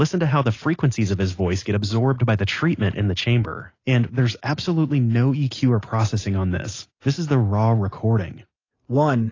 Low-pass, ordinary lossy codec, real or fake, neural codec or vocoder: 7.2 kHz; AAC, 32 kbps; real; none